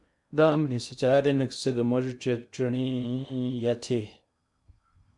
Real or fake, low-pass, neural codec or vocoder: fake; 10.8 kHz; codec, 16 kHz in and 24 kHz out, 0.6 kbps, FocalCodec, streaming, 2048 codes